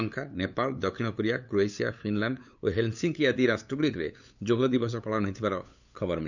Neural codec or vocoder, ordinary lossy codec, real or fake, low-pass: codec, 16 kHz, 8 kbps, FunCodec, trained on LibriTTS, 25 frames a second; none; fake; 7.2 kHz